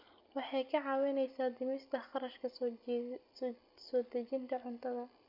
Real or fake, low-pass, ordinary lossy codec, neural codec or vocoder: real; 5.4 kHz; none; none